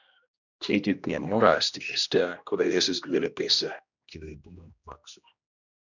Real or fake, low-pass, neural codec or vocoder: fake; 7.2 kHz; codec, 16 kHz, 1 kbps, X-Codec, HuBERT features, trained on general audio